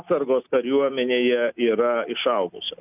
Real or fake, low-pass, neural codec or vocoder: real; 3.6 kHz; none